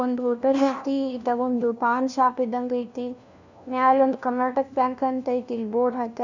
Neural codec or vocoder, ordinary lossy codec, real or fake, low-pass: codec, 16 kHz, 1 kbps, FunCodec, trained on LibriTTS, 50 frames a second; none; fake; 7.2 kHz